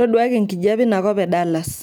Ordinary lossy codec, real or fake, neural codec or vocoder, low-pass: none; real; none; none